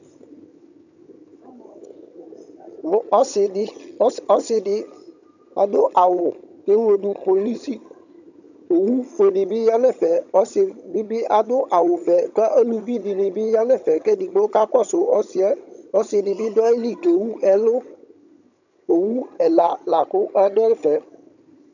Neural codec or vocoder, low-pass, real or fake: vocoder, 22.05 kHz, 80 mel bands, HiFi-GAN; 7.2 kHz; fake